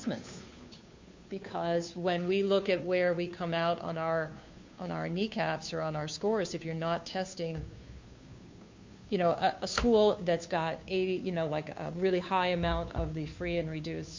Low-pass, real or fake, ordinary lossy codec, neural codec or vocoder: 7.2 kHz; fake; MP3, 48 kbps; codec, 16 kHz, 2 kbps, FunCodec, trained on Chinese and English, 25 frames a second